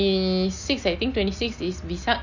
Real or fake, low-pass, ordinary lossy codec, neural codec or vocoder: real; 7.2 kHz; none; none